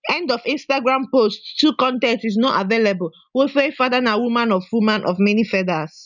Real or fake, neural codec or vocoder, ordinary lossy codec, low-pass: real; none; none; 7.2 kHz